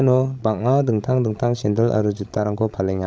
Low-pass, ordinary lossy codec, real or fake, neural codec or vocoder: none; none; fake; codec, 16 kHz, 16 kbps, FreqCodec, larger model